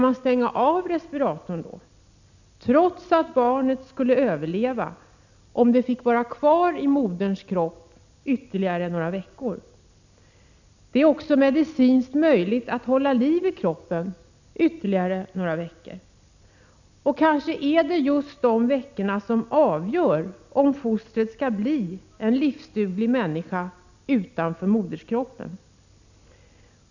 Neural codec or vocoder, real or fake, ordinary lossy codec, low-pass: none; real; none; 7.2 kHz